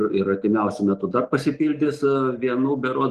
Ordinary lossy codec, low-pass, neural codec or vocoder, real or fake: Opus, 24 kbps; 14.4 kHz; none; real